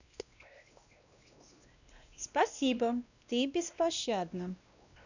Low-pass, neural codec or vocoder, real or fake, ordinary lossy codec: 7.2 kHz; codec, 16 kHz, 1 kbps, X-Codec, WavLM features, trained on Multilingual LibriSpeech; fake; none